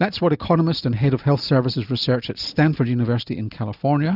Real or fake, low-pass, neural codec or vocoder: real; 5.4 kHz; none